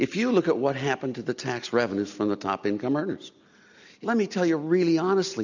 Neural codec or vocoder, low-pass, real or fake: none; 7.2 kHz; real